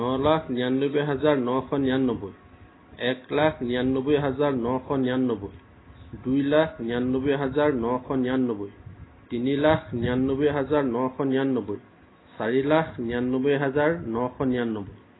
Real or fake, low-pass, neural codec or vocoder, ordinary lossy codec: real; 7.2 kHz; none; AAC, 16 kbps